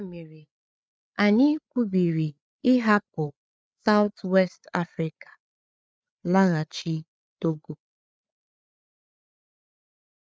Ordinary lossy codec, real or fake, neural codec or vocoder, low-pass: none; fake; codec, 16 kHz, 8 kbps, FreqCodec, larger model; none